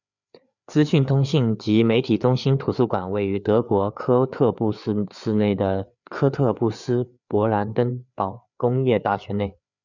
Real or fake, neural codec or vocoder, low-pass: fake; codec, 16 kHz, 4 kbps, FreqCodec, larger model; 7.2 kHz